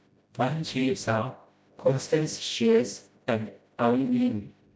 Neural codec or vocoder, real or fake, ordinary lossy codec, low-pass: codec, 16 kHz, 0.5 kbps, FreqCodec, smaller model; fake; none; none